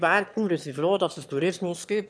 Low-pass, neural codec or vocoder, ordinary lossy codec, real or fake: none; autoencoder, 22.05 kHz, a latent of 192 numbers a frame, VITS, trained on one speaker; none; fake